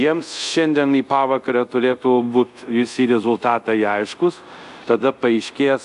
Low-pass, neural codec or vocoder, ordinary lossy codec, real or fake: 10.8 kHz; codec, 24 kHz, 0.5 kbps, DualCodec; AAC, 96 kbps; fake